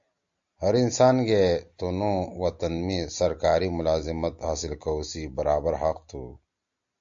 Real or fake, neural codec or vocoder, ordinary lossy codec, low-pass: real; none; AAC, 64 kbps; 7.2 kHz